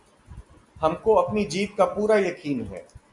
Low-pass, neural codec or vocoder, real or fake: 10.8 kHz; none; real